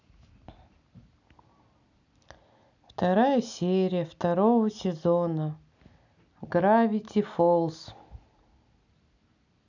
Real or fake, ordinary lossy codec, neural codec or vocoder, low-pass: real; none; none; 7.2 kHz